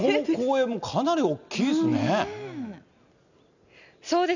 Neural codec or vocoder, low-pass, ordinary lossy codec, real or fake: none; 7.2 kHz; none; real